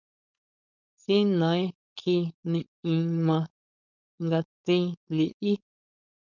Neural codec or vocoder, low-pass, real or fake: codec, 16 kHz, 4.8 kbps, FACodec; 7.2 kHz; fake